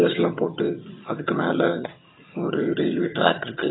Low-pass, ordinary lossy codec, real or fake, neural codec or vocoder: 7.2 kHz; AAC, 16 kbps; fake; vocoder, 22.05 kHz, 80 mel bands, HiFi-GAN